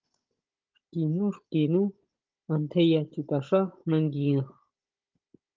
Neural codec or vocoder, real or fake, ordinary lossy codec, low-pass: codec, 16 kHz, 16 kbps, FunCodec, trained on Chinese and English, 50 frames a second; fake; Opus, 32 kbps; 7.2 kHz